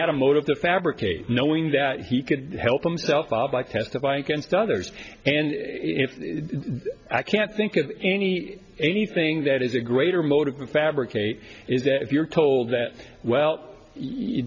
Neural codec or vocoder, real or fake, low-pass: none; real; 7.2 kHz